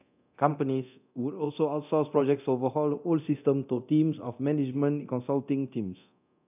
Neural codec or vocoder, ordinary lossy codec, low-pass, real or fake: codec, 24 kHz, 0.9 kbps, DualCodec; none; 3.6 kHz; fake